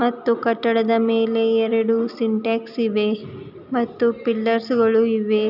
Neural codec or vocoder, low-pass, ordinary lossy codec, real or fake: none; 5.4 kHz; none; real